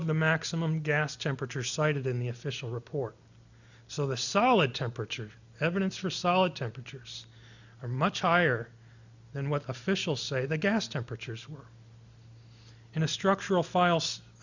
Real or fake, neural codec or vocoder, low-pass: real; none; 7.2 kHz